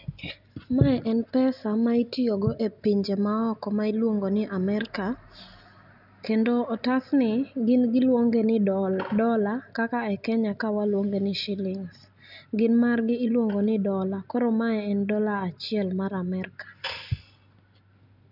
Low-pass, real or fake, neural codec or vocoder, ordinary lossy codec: 5.4 kHz; real; none; none